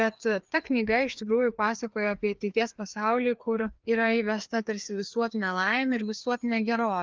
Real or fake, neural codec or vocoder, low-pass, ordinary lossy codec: fake; codec, 16 kHz, 2 kbps, FreqCodec, larger model; 7.2 kHz; Opus, 24 kbps